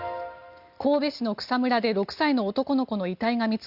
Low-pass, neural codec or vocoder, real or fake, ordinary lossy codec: 5.4 kHz; none; real; Opus, 64 kbps